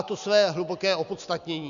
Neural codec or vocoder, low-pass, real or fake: none; 7.2 kHz; real